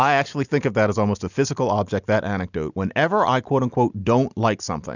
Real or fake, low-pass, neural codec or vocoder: real; 7.2 kHz; none